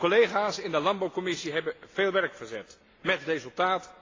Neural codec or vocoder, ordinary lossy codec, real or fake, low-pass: none; AAC, 32 kbps; real; 7.2 kHz